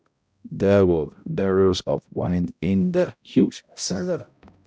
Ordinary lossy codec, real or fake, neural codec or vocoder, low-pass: none; fake; codec, 16 kHz, 0.5 kbps, X-Codec, HuBERT features, trained on balanced general audio; none